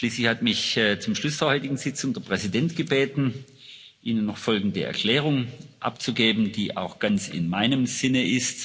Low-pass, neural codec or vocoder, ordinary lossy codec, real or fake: none; none; none; real